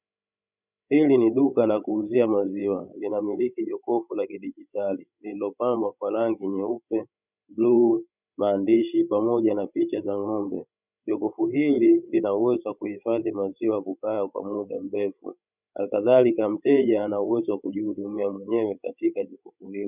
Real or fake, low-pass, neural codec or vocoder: fake; 3.6 kHz; codec, 16 kHz, 16 kbps, FreqCodec, larger model